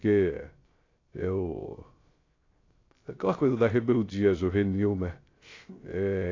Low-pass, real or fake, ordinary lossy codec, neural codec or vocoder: 7.2 kHz; fake; AAC, 32 kbps; codec, 16 kHz, 0.3 kbps, FocalCodec